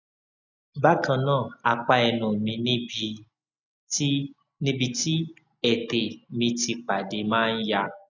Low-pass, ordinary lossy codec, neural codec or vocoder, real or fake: 7.2 kHz; none; none; real